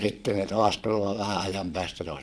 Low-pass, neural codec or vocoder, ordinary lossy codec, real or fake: none; vocoder, 22.05 kHz, 80 mel bands, Vocos; none; fake